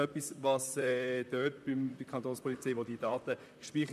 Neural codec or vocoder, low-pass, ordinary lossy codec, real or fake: vocoder, 44.1 kHz, 128 mel bands, Pupu-Vocoder; 14.4 kHz; MP3, 96 kbps; fake